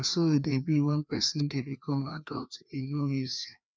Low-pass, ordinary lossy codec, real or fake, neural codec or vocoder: none; none; fake; codec, 16 kHz, 2 kbps, FreqCodec, larger model